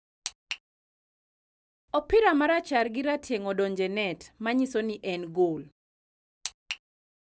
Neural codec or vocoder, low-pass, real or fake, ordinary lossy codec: none; none; real; none